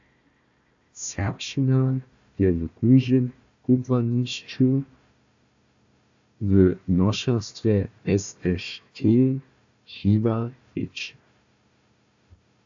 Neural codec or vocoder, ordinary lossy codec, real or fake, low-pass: codec, 16 kHz, 1 kbps, FunCodec, trained on Chinese and English, 50 frames a second; AAC, 64 kbps; fake; 7.2 kHz